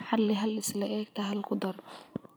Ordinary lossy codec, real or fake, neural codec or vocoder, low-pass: none; fake; vocoder, 44.1 kHz, 128 mel bands every 512 samples, BigVGAN v2; none